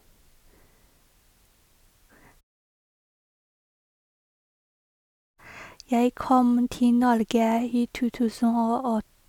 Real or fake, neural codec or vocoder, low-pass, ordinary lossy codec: real; none; 19.8 kHz; none